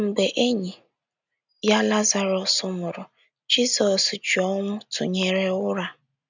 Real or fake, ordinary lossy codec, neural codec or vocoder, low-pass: real; none; none; 7.2 kHz